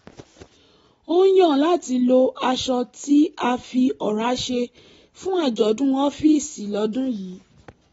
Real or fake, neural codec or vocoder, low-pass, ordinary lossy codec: real; none; 19.8 kHz; AAC, 24 kbps